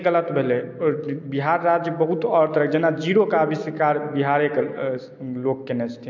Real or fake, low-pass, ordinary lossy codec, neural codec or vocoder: real; 7.2 kHz; MP3, 48 kbps; none